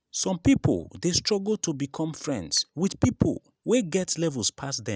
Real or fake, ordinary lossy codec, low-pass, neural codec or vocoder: real; none; none; none